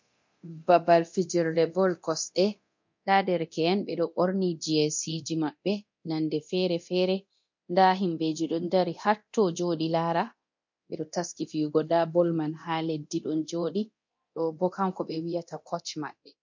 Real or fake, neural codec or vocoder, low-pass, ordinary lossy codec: fake; codec, 24 kHz, 0.9 kbps, DualCodec; 7.2 kHz; MP3, 48 kbps